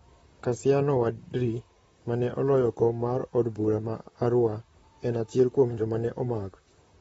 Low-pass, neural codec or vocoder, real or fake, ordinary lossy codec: 19.8 kHz; vocoder, 44.1 kHz, 128 mel bands, Pupu-Vocoder; fake; AAC, 24 kbps